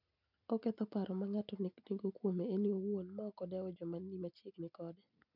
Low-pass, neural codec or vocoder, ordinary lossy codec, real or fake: 5.4 kHz; none; none; real